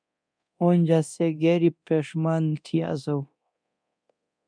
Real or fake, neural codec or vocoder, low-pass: fake; codec, 24 kHz, 0.9 kbps, DualCodec; 9.9 kHz